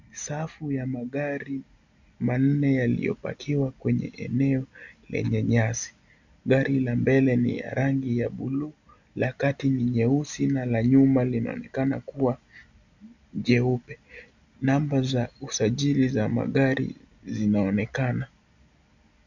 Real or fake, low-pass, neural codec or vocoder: real; 7.2 kHz; none